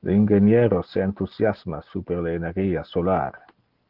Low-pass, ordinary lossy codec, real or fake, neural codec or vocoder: 5.4 kHz; Opus, 16 kbps; real; none